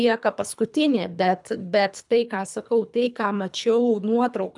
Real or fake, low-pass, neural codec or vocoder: fake; 10.8 kHz; codec, 24 kHz, 3 kbps, HILCodec